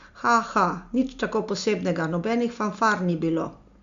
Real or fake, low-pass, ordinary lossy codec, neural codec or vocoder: real; 7.2 kHz; Opus, 64 kbps; none